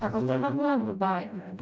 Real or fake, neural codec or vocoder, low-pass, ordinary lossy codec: fake; codec, 16 kHz, 0.5 kbps, FreqCodec, smaller model; none; none